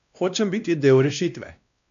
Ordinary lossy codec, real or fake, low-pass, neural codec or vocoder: AAC, 96 kbps; fake; 7.2 kHz; codec, 16 kHz, 1 kbps, X-Codec, WavLM features, trained on Multilingual LibriSpeech